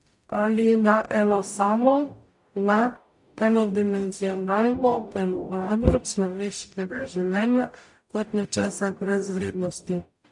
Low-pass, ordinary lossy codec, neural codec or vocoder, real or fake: 10.8 kHz; MP3, 64 kbps; codec, 44.1 kHz, 0.9 kbps, DAC; fake